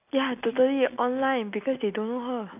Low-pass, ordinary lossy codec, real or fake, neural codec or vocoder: 3.6 kHz; none; real; none